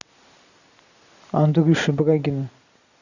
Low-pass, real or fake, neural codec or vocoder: 7.2 kHz; real; none